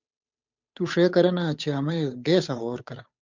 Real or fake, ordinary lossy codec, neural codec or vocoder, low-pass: fake; MP3, 64 kbps; codec, 16 kHz, 8 kbps, FunCodec, trained on Chinese and English, 25 frames a second; 7.2 kHz